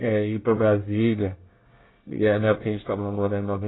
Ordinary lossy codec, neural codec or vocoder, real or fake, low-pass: AAC, 16 kbps; codec, 24 kHz, 1 kbps, SNAC; fake; 7.2 kHz